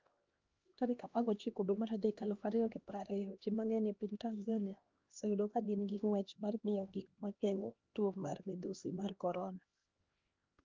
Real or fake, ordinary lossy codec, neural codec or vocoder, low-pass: fake; Opus, 32 kbps; codec, 16 kHz, 1 kbps, X-Codec, HuBERT features, trained on LibriSpeech; 7.2 kHz